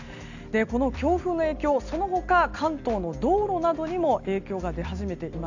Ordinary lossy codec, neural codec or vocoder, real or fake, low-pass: none; none; real; 7.2 kHz